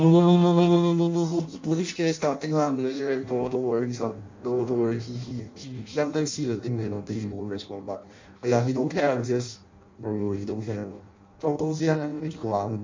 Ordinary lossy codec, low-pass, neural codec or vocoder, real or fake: MP3, 64 kbps; 7.2 kHz; codec, 16 kHz in and 24 kHz out, 0.6 kbps, FireRedTTS-2 codec; fake